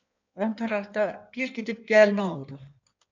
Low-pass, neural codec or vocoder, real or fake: 7.2 kHz; codec, 16 kHz in and 24 kHz out, 1.1 kbps, FireRedTTS-2 codec; fake